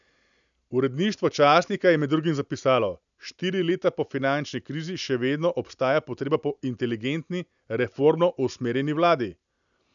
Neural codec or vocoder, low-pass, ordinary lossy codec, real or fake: none; 7.2 kHz; none; real